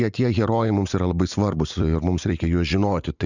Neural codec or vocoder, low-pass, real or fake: vocoder, 44.1 kHz, 80 mel bands, Vocos; 7.2 kHz; fake